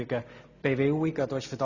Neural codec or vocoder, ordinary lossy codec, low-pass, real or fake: none; none; 7.2 kHz; real